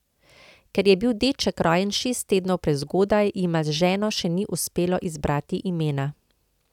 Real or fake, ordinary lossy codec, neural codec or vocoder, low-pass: real; none; none; 19.8 kHz